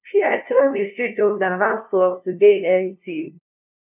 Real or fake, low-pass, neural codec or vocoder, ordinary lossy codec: fake; 3.6 kHz; codec, 16 kHz, 0.5 kbps, FunCodec, trained on LibriTTS, 25 frames a second; none